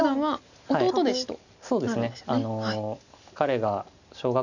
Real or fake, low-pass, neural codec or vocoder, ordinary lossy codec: real; 7.2 kHz; none; none